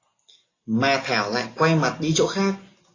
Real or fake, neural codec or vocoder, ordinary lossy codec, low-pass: real; none; AAC, 32 kbps; 7.2 kHz